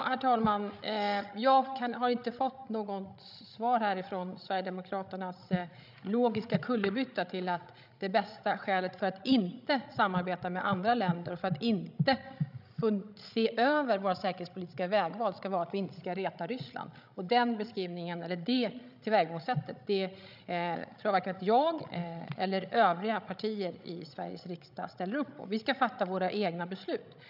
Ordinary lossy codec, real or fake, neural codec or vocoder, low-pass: none; fake; codec, 16 kHz, 16 kbps, FreqCodec, larger model; 5.4 kHz